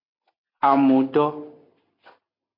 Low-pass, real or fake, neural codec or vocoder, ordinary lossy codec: 5.4 kHz; real; none; MP3, 32 kbps